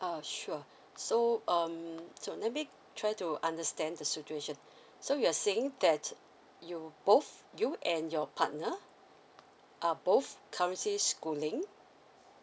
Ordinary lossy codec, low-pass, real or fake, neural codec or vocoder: none; none; real; none